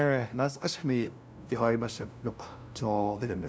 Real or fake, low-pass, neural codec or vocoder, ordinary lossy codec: fake; none; codec, 16 kHz, 0.5 kbps, FunCodec, trained on LibriTTS, 25 frames a second; none